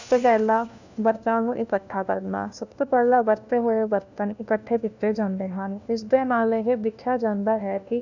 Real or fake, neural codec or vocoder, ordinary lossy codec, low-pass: fake; codec, 16 kHz, 1 kbps, FunCodec, trained on LibriTTS, 50 frames a second; none; 7.2 kHz